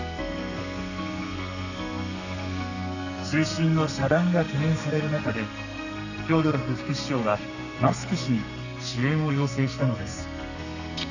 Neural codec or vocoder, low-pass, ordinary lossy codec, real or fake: codec, 32 kHz, 1.9 kbps, SNAC; 7.2 kHz; none; fake